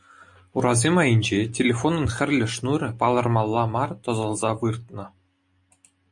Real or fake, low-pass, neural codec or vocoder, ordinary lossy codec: real; 10.8 kHz; none; MP3, 48 kbps